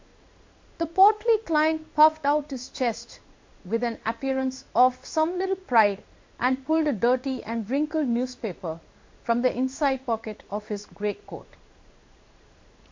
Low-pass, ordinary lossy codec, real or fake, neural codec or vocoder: 7.2 kHz; MP3, 48 kbps; fake; codec, 16 kHz in and 24 kHz out, 1 kbps, XY-Tokenizer